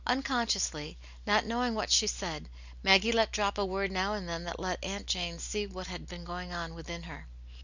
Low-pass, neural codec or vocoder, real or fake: 7.2 kHz; none; real